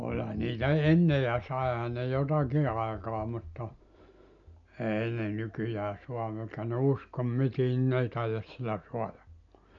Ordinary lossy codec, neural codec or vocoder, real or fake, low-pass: none; none; real; 7.2 kHz